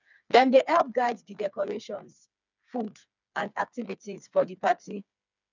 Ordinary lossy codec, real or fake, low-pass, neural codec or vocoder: none; fake; 7.2 kHz; codec, 16 kHz, 4 kbps, FreqCodec, smaller model